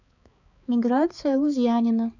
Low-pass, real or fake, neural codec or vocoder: 7.2 kHz; fake; codec, 16 kHz, 4 kbps, X-Codec, HuBERT features, trained on balanced general audio